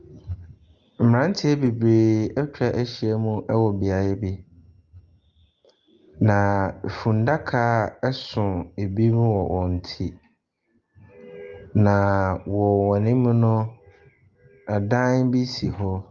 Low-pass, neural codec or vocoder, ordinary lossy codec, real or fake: 7.2 kHz; none; Opus, 32 kbps; real